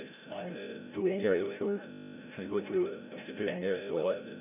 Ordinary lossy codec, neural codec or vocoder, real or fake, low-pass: none; codec, 16 kHz, 0.5 kbps, FreqCodec, larger model; fake; 3.6 kHz